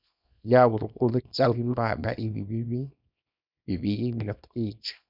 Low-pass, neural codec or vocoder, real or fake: 5.4 kHz; codec, 24 kHz, 0.9 kbps, WavTokenizer, small release; fake